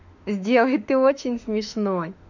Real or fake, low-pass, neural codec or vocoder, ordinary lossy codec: fake; 7.2 kHz; autoencoder, 48 kHz, 32 numbers a frame, DAC-VAE, trained on Japanese speech; none